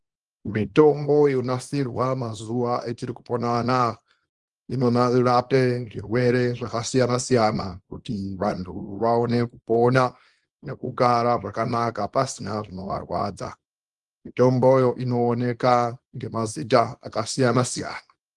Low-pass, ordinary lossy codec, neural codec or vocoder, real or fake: 10.8 kHz; Opus, 24 kbps; codec, 24 kHz, 0.9 kbps, WavTokenizer, small release; fake